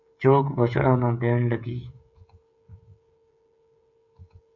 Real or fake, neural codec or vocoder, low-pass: fake; codec, 16 kHz, 16 kbps, FreqCodec, smaller model; 7.2 kHz